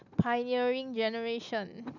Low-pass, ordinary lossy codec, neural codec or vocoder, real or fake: 7.2 kHz; none; none; real